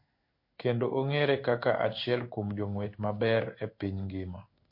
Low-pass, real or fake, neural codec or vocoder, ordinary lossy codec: 5.4 kHz; fake; codec, 16 kHz in and 24 kHz out, 1 kbps, XY-Tokenizer; MP3, 32 kbps